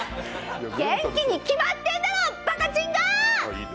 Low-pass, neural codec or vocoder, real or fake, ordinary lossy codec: none; none; real; none